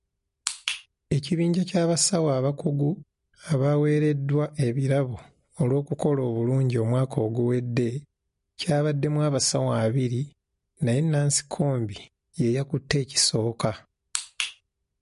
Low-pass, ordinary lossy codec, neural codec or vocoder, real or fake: 10.8 kHz; MP3, 48 kbps; none; real